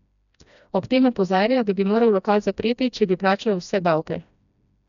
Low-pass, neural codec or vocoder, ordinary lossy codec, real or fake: 7.2 kHz; codec, 16 kHz, 1 kbps, FreqCodec, smaller model; none; fake